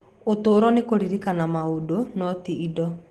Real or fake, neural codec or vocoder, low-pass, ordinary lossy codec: real; none; 14.4 kHz; Opus, 16 kbps